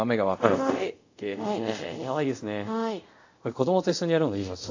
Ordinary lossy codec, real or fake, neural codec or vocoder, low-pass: AAC, 48 kbps; fake; codec, 24 kHz, 0.5 kbps, DualCodec; 7.2 kHz